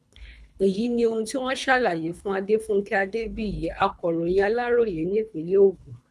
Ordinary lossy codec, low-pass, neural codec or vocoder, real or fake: none; none; codec, 24 kHz, 3 kbps, HILCodec; fake